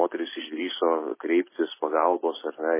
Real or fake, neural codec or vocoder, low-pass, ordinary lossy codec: real; none; 3.6 kHz; MP3, 16 kbps